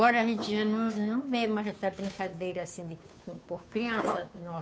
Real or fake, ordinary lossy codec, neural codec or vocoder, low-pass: fake; none; codec, 16 kHz, 2 kbps, FunCodec, trained on Chinese and English, 25 frames a second; none